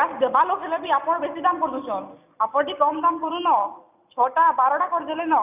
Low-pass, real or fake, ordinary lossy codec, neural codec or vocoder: 3.6 kHz; real; none; none